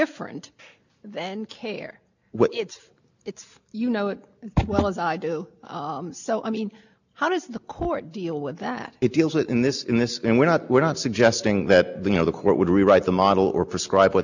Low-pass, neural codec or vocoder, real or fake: 7.2 kHz; none; real